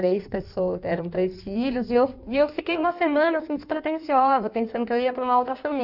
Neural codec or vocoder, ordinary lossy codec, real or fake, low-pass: codec, 16 kHz in and 24 kHz out, 1.1 kbps, FireRedTTS-2 codec; none; fake; 5.4 kHz